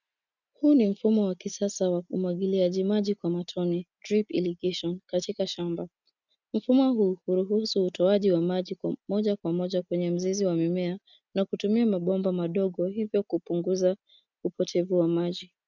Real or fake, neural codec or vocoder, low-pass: real; none; 7.2 kHz